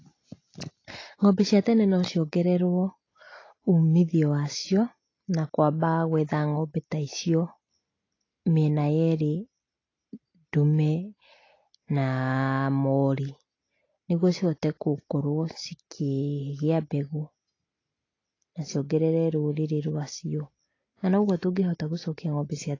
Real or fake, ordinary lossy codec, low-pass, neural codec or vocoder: real; AAC, 32 kbps; 7.2 kHz; none